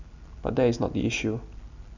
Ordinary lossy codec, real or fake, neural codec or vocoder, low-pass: none; real; none; 7.2 kHz